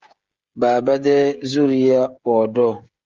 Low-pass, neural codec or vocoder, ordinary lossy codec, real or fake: 7.2 kHz; codec, 16 kHz, 16 kbps, FreqCodec, smaller model; Opus, 32 kbps; fake